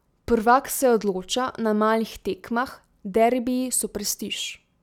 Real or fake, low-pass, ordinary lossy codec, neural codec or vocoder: real; 19.8 kHz; none; none